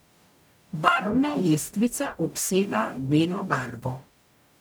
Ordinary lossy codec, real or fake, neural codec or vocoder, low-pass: none; fake; codec, 44.1 kHz, 0.9 kbps, DAC; none